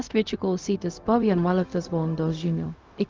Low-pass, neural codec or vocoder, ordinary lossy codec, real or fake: 7.2 kHz; codec, 16 kHz, 0.4 kbps, LongCat-Audio-Codec; Opus, 32 kbps; fake